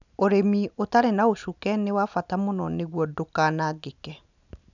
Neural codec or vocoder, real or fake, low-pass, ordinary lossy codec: none; real; 7.2 kHz; none